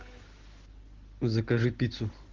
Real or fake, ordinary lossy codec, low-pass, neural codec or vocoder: real; Opus, 16 kbps; 7.2 kHz; none